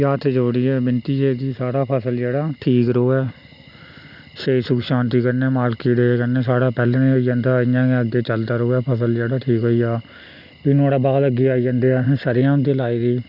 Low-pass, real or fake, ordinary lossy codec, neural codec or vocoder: 5.4 kHz; real; Opus, 64 kbps; none